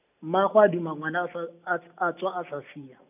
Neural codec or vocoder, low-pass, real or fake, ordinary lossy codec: none; 3.6 kHz; real; none